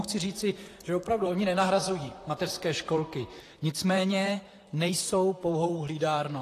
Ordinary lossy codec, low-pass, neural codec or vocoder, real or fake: AAC, 48 kbps; 14.4 kHz; vocoder, 44.1 kHz, 128 mel bands, Pupu-Vocoder; fake